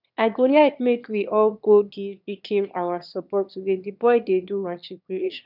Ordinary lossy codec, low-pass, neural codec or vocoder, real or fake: none; 5.4 kHz; autoencoder, 22.05 kHz, a latent of 192 numbers a frame, VITS, trained on one speaker; fake